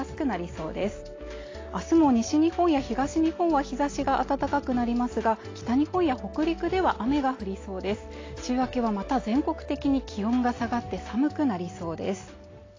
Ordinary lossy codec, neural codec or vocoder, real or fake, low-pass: AAC, 32 kbps; none; real; 7.2 kHz